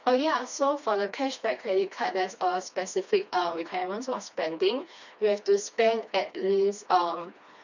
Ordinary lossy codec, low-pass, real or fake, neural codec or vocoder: none; 7.2 kHz; fake; codec, 16 kHz, 2 kbps, FreqCodec, smaller model